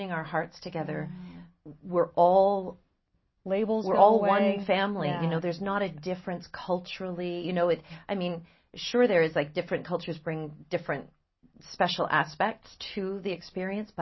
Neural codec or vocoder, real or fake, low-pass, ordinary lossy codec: none; real; 7.2 kHz; MP3, 24 kbps